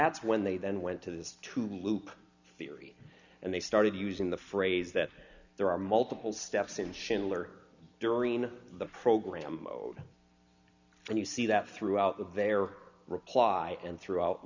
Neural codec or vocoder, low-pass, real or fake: none; 7.2 kHz; real